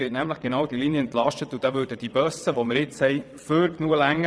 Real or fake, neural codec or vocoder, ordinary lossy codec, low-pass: fake; vocoder, 22.05 kHz, 80 mel bands, WaveNeXt; none; none